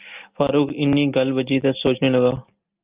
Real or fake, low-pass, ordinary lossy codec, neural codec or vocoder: real; 3.6 kHz; Opus, 24 kbps; none